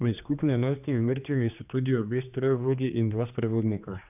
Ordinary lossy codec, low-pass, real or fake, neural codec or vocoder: none; 3.6 kHz; fake; codec, 16 kHz, 2 kbps, X-Codec, HuBERT features, trained on general audio